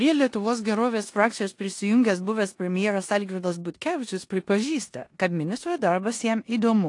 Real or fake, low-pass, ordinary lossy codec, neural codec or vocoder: fake; 10.8 kHz; AAC, 48 kbps; codec, 16 kHz in and 24 kHz out, 0.9 kbps, LongCat-Audio-Codec, four codebook decoder